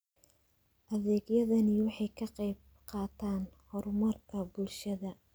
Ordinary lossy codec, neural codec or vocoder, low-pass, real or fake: none; none; none; real